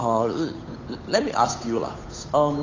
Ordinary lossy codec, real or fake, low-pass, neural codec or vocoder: AAC, 32 kbps; fake; 7.2 kHz; codec, 16 kHz, 8 kbps, FunCodec, trained on Chinese and English, 25 frames a second